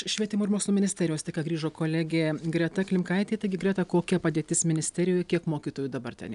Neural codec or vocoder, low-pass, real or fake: none; 10.8 kHz; real